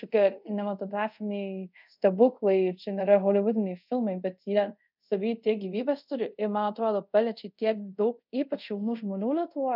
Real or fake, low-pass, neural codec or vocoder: fake; 5.4 kHz; codec, 24 kHz, 0.5 kbps, DualCodec